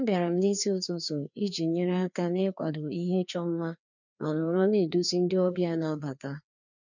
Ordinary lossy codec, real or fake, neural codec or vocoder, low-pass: none; fake; codec, 16 kHz, 2 kbps, FreqCodec, larger model; 7.2 kHz